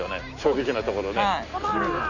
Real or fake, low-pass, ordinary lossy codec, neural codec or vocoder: real; 7.2 kHz; none; none